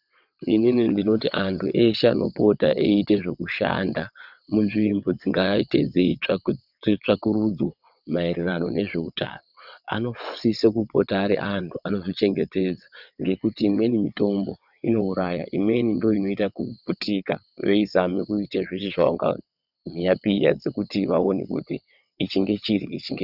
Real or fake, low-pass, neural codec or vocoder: fake; 5.4 kHz; vocoder, 22.05 kHz, 80 mel bands, WaveNeXt